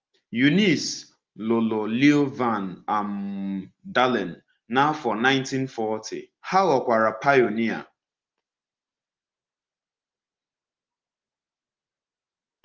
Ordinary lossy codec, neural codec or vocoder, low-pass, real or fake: Opus, 32 kbps; none; 7.2 kHz; real